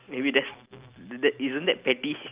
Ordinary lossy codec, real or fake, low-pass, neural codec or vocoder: Opus, 24 kbps; real; 3.6 kHz; none